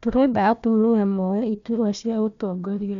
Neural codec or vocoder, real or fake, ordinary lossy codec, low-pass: codec, 16 kHz, 1 kbps, FunCodec, trained on Chinese and English, 50 frames a second; fake; none; 7.2 kHz